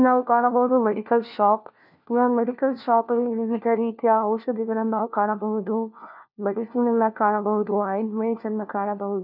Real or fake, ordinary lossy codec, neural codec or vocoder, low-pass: fake; none; codec, 16 kHz, 1 kbps, FunCodec, trained on LibriTTS, 50 frames a second; 5.4 kHz